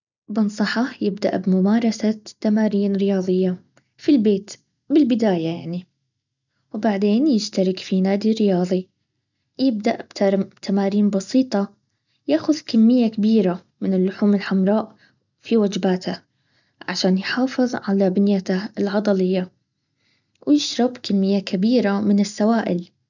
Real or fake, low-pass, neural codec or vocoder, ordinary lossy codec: real; 7.2 kHz; none; none